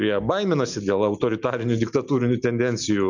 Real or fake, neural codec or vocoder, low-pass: real; none; 7.2 kHz